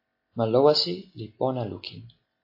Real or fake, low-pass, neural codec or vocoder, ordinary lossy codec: real; 5.4 kHz; none; AAC, 32 kbps